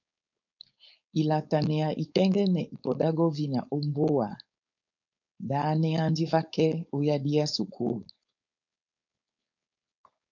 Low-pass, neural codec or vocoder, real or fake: 7.2 kHz; codec, 16 kHz, 4.8 kbps, FACodec; fake